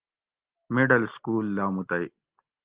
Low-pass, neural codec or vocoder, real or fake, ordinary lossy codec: 3.6 kHz; none; real; Opus, 16 kbps